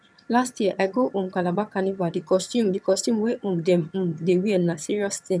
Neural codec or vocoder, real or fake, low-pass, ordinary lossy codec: vocoder, 22.05 kHz, 80 mel bands, HiFi-GAN; fake; none; none